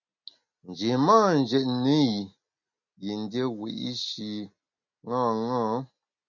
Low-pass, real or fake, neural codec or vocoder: 7.2 kHz; real; none